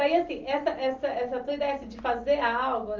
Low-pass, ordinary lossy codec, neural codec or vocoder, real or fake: 7.2 kHz; Opus, 32 kbps; none; real